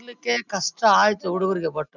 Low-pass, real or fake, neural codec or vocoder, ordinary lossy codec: 7.2 kHz; real; none; none